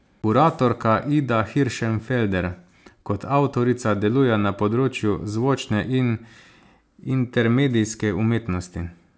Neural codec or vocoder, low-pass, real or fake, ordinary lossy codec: none; none; real; none